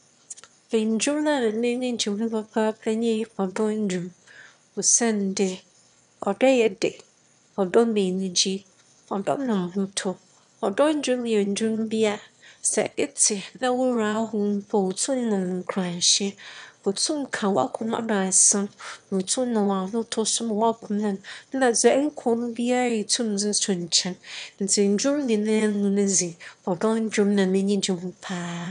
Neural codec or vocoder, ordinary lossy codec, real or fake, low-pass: autoencoder, 22.05 kHz, a latent of 192 numbers a frame, VITS, trained on one speaker; none; fake; 9.9 kHz